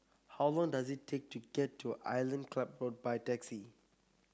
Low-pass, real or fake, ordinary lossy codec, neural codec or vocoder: none; real; none; none